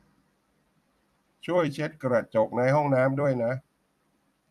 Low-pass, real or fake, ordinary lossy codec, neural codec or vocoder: 14.4 kHz; fake; none; vocoder, 44.1 kHz, 128 mel bands every 256 samples, BigVGAN v2